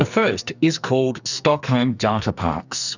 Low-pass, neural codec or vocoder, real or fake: 7.2 kHz; codec, 44.1 kHz, 2.6 kbps, SNAC; fake